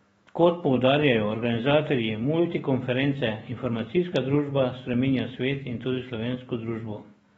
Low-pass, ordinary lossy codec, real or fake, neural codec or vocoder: 19.8 kHz; AAC, 24 kbps; fake; autoencoder, 48 kHz, 128 numbers a frame, DAC-VAE, trained on Japanese speech